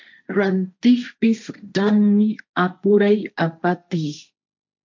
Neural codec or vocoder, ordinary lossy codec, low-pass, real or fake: codec, 16 kHz, 1.1 kbps, Voila-Tokenizer; MP3, 64 kbps; 7.2 kHz; fake